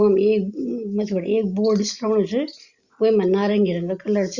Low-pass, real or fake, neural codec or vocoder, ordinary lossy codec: 7.2 kHz; real; none; Opus, 64 kbps